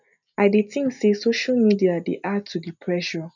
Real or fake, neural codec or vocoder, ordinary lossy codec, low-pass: real; none; none; 7.2 kHz